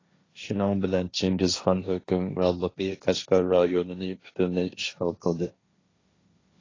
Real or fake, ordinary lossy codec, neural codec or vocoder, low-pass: fake; AAC, 32 kbps; codec, 16 kHz, 1.1 kbps, Voila-Tokenizer; 7.2 kHz